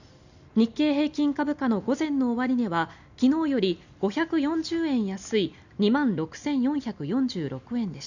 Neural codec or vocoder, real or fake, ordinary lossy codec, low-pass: none; real; none; 7.2 kHz